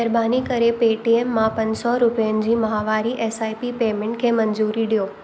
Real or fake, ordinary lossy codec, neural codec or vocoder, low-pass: real; none; none; none